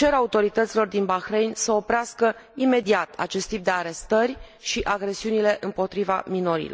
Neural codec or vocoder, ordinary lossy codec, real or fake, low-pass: none; none; real; none